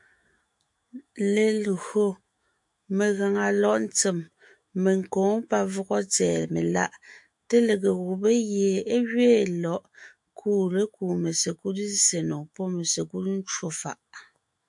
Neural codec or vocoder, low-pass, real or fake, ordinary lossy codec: autoencoder, 48 kHz, 128 numbers a frame, DAC-VAE, trained on Japanese speech; 10.8 kHz; fake; MP3, 64 kbps